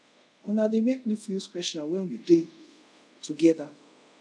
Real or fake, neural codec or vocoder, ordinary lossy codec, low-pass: fake; codec, 24 kHz, 0.5 kbps, DualCodec; none; none